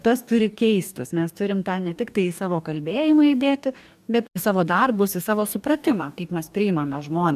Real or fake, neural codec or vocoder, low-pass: fake; codec, 44.1 kHz, 2.6 kbps, DAC; 14.4 kHz